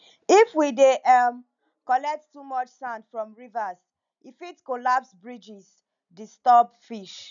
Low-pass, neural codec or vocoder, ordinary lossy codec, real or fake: 7.2 kHz; none; none; real